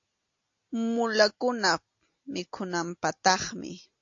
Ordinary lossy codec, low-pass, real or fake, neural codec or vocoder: AAC, 48 kbps; 7.2 kHz; real; none